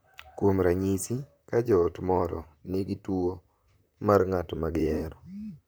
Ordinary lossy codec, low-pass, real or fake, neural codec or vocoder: none; none; fake; vocoder, 44.1 kHz, 128 mel bands, Pupu-Vocoder